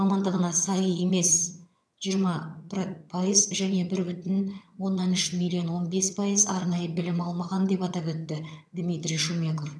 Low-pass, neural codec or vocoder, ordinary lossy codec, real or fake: none; vocoder, 22.05 kHz, 80 mel bands, HiFi-GAN; none; fake